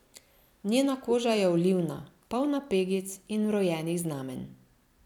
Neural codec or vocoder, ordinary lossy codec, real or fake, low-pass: vocoder, 44.1 kHz, 128 mel bands every 256 samples, BigVGAN v2; none; fake; 19.8 kHz